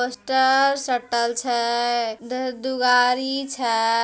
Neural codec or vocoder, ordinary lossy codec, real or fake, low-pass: none; none; real; none